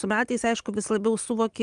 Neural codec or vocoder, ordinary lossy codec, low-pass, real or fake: none; Opus, 32 kbps; 9.9 kHz; real